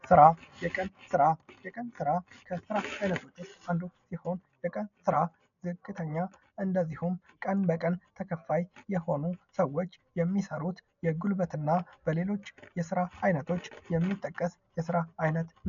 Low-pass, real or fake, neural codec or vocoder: 7.2 kHz; real; none